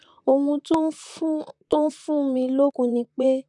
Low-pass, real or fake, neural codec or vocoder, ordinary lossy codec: 10.8 kHz; fake; vocoder, 44.1 kHz, 128 mel bands, Pupu-Vocoder; none